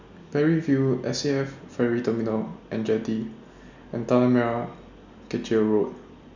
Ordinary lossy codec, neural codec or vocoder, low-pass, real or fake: none; none; 7.2 kHz; real